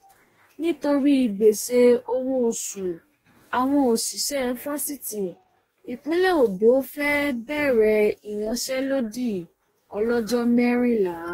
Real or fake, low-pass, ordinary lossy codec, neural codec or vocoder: fake; 19.8 kHz; AAC, 48 kbps; codec, 44.1 kHz, 2.6 kbps, DAC